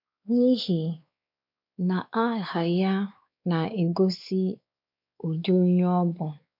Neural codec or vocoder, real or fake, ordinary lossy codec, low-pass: codec, 16 kHz, 4 kbps, X-Codec, WavLM features, trained on Multilingual LibriSpeech; fake; none; 5.4 kHz